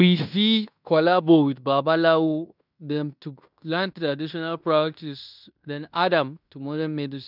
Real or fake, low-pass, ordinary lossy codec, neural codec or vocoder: fake; 5.4 kHz; none; codec, 16 kHz in and 24 kHz out, 0.9 kbps, LongCat-Audio-Codec, fine tuned four codebook decoder